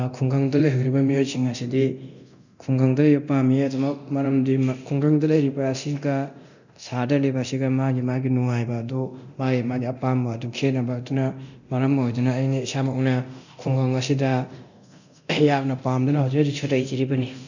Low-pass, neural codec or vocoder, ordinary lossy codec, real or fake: 7.2 kHz; codec, 24 kHz, 0.9 kbps, DualCodec; none; fake